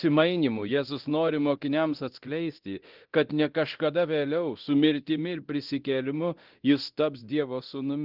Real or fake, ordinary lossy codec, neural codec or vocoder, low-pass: fake; Opus, 16 kbps; codec, 24 kHz, 0.9 kbps, DualCodec; 5.4 kHz